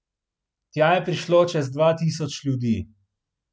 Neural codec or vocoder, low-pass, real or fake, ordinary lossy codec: none; none; real; none